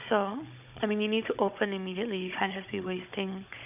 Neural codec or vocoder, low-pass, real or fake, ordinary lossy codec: codec, 16 kHz, 16 kbps, FunCodec, trained on Chinese and English, 50 frames a second; 3.6 kHz; fake; none